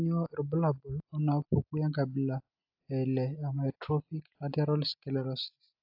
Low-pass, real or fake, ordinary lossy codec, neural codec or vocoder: 5.4 kHz; real; AAC, 48 kbps; none